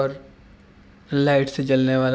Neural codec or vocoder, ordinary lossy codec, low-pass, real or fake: none; none; none; real